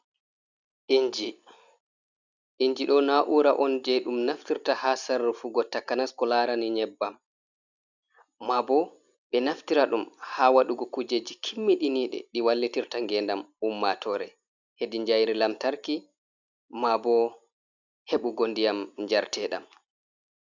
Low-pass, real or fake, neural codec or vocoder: 7.2 kHz; real; none